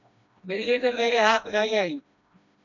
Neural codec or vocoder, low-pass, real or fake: codec, 16 kHz, 1 kbps, FreqCodec, smaller model; 7.2 kHz; fake